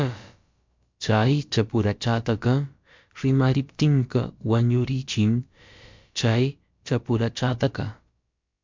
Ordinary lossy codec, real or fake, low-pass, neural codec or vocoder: MP3, 64 kbps; fake; 7.2 kHz; codec, 16 kHz, about 1 kbps, DyCAST, with the encoder's durations